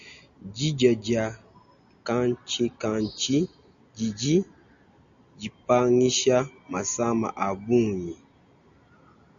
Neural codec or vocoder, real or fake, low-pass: none; real; 7.2 kHz